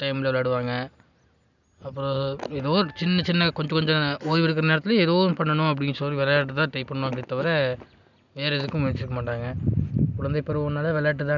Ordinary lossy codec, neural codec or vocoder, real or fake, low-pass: none; none; real; 7.2 kHz